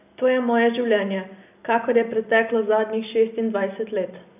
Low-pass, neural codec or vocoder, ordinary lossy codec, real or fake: 3.6 kHz; none; none; real